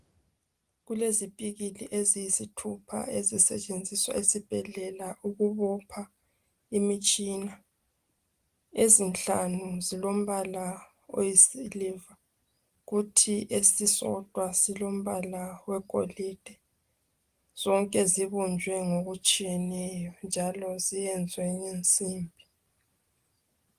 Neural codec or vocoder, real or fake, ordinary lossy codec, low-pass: none; real; Opus, 24 kbps; 14.4 kHz